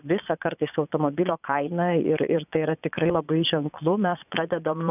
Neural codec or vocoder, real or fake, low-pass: vocoder, 22.05 kHz, 80 mel bands, Vocos; fake; 3.6 kHz